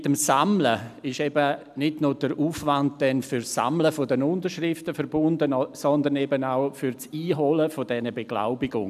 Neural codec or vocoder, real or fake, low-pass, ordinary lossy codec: none; real; 14.4 kHz; none